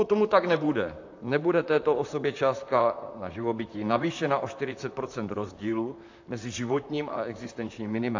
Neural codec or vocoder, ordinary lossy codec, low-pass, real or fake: vocoder, 44.1 kHz, 128 mel bands, Pupu-Vocoder; AAC, 48 kbps; 7.2 kHz; fake